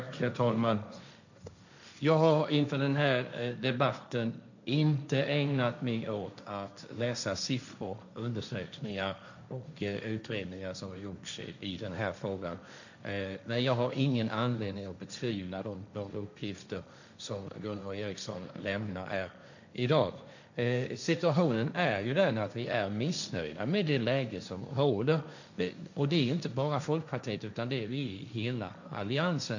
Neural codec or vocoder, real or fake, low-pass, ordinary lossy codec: codec, 16 kHz, 1.1 kbps, Voila-Tokenizer; fake; 7.2 kHz; none